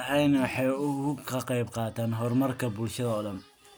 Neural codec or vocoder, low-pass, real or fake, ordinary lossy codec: none; none; real; none